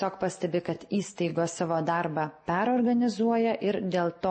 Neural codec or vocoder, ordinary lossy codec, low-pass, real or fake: none; MP3, 32 kbps; 9.9 kHz; real